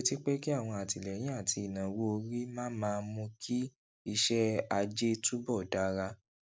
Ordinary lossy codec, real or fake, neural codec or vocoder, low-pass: none; real; none; none